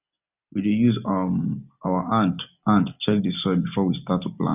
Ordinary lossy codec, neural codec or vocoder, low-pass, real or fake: none; none; 3.6 kHz; real